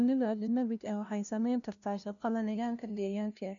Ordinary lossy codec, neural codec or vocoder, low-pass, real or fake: none; codec, 16 kHz, 0.5 kbps, FunCodec, trained on LibriTTS, 25 frames a second; 7.2 kHz; fake